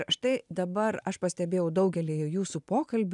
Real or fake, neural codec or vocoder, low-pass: real; none; 10.8 kHz